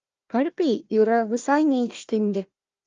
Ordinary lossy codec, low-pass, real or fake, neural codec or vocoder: Opus, 24 kbps; 7.2 kHz; fake; codec, 16 kHz, 1 kbps, FunCodec, trained on Chinese and English, 50 frames a second